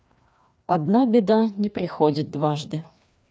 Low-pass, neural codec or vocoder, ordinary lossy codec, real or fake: none; codec, 16 kHz, 2 kbps, FreqCodec, larger model; none; fake